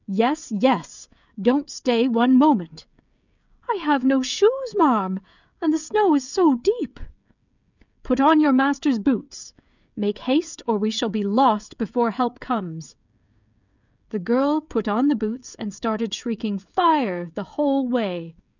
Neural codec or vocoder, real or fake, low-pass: codec, 16 kHz, 16 kbps, FreqCodec, smaller model; fake; 7.2 kHz